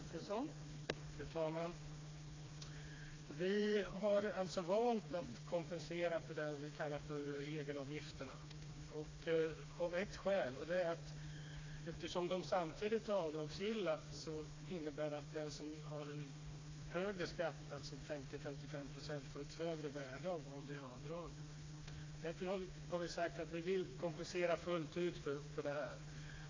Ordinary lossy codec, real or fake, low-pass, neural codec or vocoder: AAC, 32 kbps; fake; 7.2 kHz; codec, 16 kHz, 2 kbps, FreqCodec, smaller model